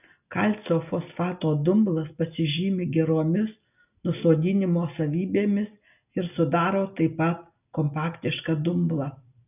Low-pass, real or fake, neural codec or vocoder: 3.6 kHz; real; none